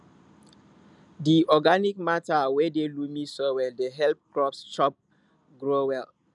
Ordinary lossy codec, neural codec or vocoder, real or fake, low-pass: none; none; real; 10.8 kHz